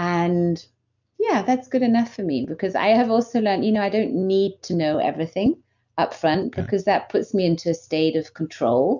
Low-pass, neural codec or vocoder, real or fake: 7.2 kHz; none; real